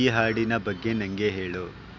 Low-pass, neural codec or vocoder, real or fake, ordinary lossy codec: 7.2 kHz; none; real; none